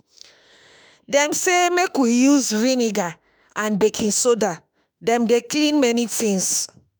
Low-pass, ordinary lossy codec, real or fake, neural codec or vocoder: none; none; fake; autoencoder, 48 kHz, 32 numbers a frame, DAC-VAE, trained on Japanese speech